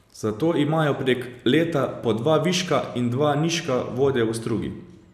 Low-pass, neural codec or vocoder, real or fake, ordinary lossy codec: 14.4 kHz; none; real; none